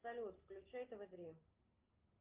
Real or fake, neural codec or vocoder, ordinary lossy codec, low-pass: real; none; Opus, 32 kbps; 3.6 kHz